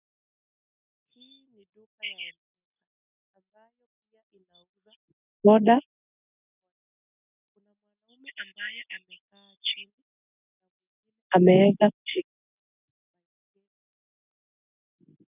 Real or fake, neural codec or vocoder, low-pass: real; none; 3.6 kHz